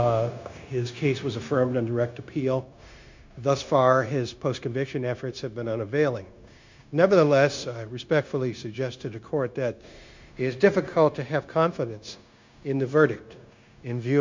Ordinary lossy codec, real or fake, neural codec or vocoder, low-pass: MP3, 64 kbps; fake; codec, 16 kHz, 0.9 kbps, LongCat-Audio-Codec; 7.2 kHz